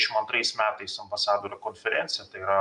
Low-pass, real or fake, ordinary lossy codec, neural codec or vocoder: 10.8 kHz; real; MP3, 96 kbps; none